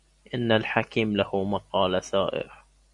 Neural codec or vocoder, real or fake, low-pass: none; real; 10.8 kHz